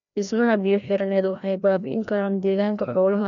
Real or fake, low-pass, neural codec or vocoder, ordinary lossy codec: fake; 7.2 kHz; codec, 16 kHz, 1 kbps, FreqCodec, larger model; none